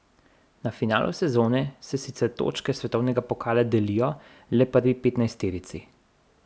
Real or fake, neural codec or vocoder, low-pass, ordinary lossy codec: real; none; none; none